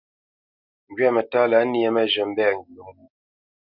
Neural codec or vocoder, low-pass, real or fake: none; 5.4 kHz; real